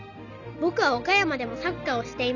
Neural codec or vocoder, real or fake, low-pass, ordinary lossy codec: none; real; 7.2 kHz; none